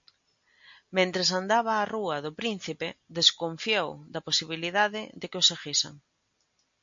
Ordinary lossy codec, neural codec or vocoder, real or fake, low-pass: MP3, 48 kbps; none; real; 7.2 kHz